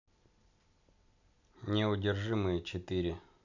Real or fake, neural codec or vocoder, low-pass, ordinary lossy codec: real; none; 7.2 kHz; none